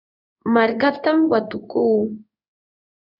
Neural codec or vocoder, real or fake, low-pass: codec, 16 kHz in and 24 kHz out, 1 kbps, XY-Tokenizer; fake; 5.4 kHz